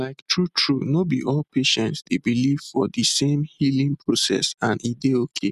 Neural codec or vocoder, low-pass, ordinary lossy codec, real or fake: none; 14.4 kHz; none; real